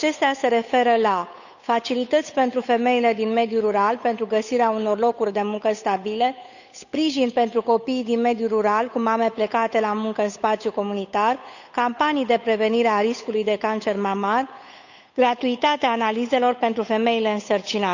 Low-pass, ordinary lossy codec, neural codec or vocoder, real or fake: 7.2 kHz; none; codec, 16 kHz, 8 kbps, FunCodec, trained on Chinese and English, 25 frames a second; fake